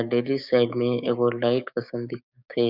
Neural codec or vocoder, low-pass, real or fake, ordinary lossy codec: codec, 16 kHz, 6 kbps, DAC; 5.4 kHz; fake; none